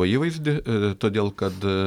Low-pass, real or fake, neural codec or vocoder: 19.8 kHz; real; none